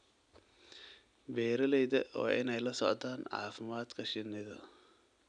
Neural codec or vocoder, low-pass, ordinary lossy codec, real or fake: none; 9.9 kHz; none; real